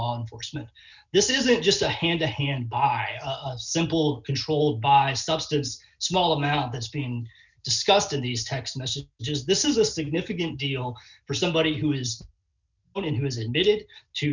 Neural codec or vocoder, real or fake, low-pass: none; real; 7.2 kHz